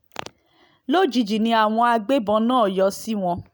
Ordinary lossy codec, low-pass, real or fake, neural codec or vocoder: none; none; real; none